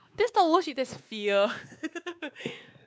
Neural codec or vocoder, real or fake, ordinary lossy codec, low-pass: codec, 16 kHz, 2 kbps, X-Codec, WavLM features, trained on Multilingual LibriSpeech; fake; none; none